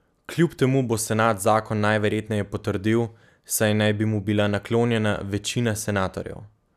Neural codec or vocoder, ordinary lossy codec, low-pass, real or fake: none; none; 14.4 kHz; real